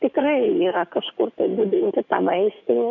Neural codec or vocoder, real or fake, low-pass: codec, 44.1 kHz, 7.8 kbps, DAC; fake; 7.2 kHz